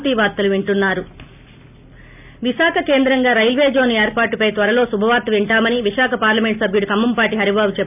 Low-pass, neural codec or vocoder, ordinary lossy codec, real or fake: 3.6 kHz; none; AAC, 32 kbps; real